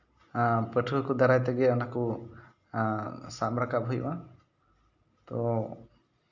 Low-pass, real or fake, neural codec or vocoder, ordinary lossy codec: 7.2 kHz; real; none; none